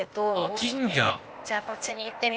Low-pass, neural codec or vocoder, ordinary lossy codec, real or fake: none; codec, 16 kHz, 0.8 kbps, ZipCodec; none; fake